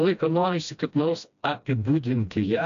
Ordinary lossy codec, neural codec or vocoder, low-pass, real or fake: MP3, 96 kbps; codec, 16 kHz, 1 kbps, FreqCodec, smaller model; 7.2 kHz; fake